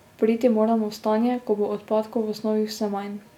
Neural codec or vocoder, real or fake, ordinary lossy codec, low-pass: none; real; none; 19.8 kHz